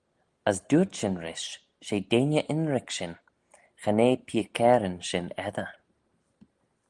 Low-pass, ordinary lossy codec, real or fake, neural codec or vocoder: 10.8 kHz; Opus, 24 kbps; real; none